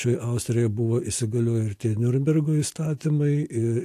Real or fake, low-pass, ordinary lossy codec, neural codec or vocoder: real; 14.4 kHz; AAC, 64 kbps; none